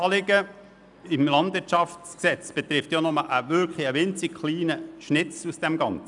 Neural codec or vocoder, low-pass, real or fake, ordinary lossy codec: none; 10.8 kHz; real; none